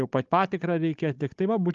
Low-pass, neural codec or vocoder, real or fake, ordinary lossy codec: 7.2 kHz; codec, 16 kHz, 16 kbps, FunCodec, trained on Chinese and English, 50 frames a second; fake; Opus, 32 kbps